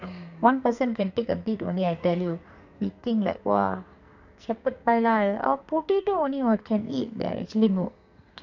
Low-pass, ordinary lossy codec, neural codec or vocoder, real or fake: 7.2 kHz; none; codec, 44.1 kHz, 2.6 kbps, SNAC; fake